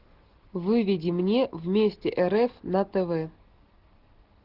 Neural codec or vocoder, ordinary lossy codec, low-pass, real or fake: none; Opus, 16 kbps; 5.4 kHz; real